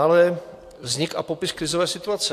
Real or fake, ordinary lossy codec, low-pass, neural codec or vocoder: real; AAC, 64 kbps; 14.4 kHz; none